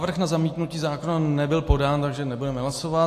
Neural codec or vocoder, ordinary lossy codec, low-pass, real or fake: none; AAC, 64 kbps; 14.4 kHz; real